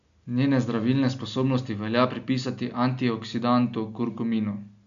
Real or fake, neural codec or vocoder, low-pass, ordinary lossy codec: real; none; 7.2 kHz; MP3, 64 kbps